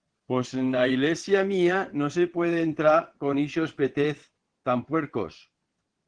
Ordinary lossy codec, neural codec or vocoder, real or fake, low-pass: Opus, 16 kbps; vocoder, 22.05 kHz, 80 mel bands, WaveNeXt; fake; 9.9 kHz